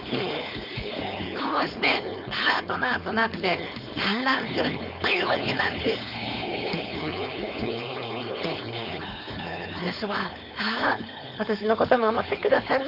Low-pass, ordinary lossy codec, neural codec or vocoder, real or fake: 5.4 kHz; none; codec, 16 kHz, 4.8 kbps, FACodec; fake